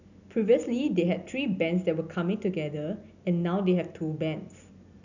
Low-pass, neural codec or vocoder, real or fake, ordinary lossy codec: 7.2 kHz; none; real; none